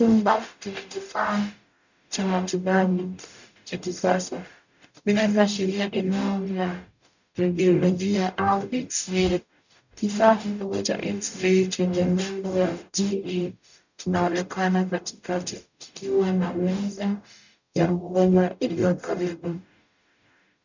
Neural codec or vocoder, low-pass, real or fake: codec, 44.1 kHz, 0.9 kbps, DAC; 7.2 kHz; fake